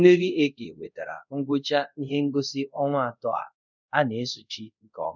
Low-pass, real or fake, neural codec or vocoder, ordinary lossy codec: 7.2 kHz; fake; codec, 24 kHz, 0.5 kbps, DualCodec; none